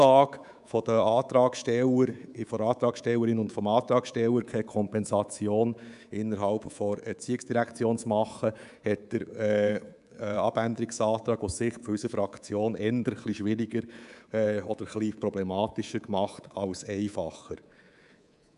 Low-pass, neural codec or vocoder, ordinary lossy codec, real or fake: 10.8 kHz; codec, 24 kHz, 3.1 kbps, DualCodec; none; fake